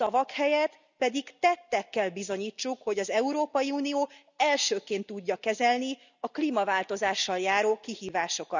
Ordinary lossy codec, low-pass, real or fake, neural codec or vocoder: none; 7.2 kHz; real; none